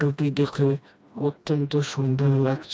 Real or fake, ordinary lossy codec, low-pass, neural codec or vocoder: fake; none; none; codec, 16 kHz, 1 kbps, FreqCodec, smaller model